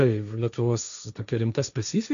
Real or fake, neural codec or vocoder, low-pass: fake; codec, 16 kHz, 1.1 kbps, Voila-Tokenizer; 7.2 kHz